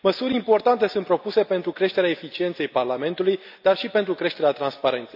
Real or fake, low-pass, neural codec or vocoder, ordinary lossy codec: real; 5.4 kHz; none; AAC, 48 kbps